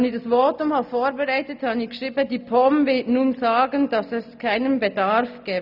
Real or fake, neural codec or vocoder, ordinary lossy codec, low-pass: real; none; none; 5.4 kHz